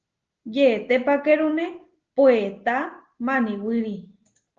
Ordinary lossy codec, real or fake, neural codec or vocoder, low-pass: Opus, 16 kbps; real; none; 7.2 kHz